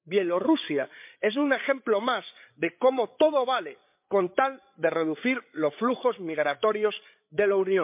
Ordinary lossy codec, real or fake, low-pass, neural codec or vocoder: MP3, 32 kbps; fake; 3.6 kHz; codec, 16 kHz, 8 kbps, FreqCodec, larger model